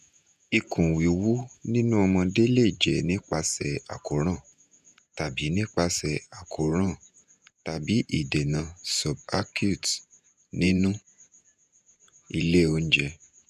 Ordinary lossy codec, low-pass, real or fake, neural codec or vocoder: none; 14.4 kHz; fake; vocoder, 48 kHz, 128 mel bands, Vocos